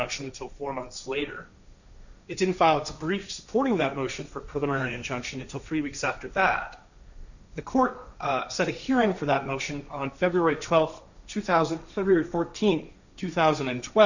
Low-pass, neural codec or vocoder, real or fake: 7.2 kHz; codec, 16 kHz, 1.1 kbps, Voila-Tokenizer; fake